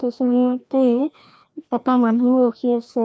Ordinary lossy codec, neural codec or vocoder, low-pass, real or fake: none; codec, 16 kHz, 1 kbps, FreqCodec, larger model; none; fake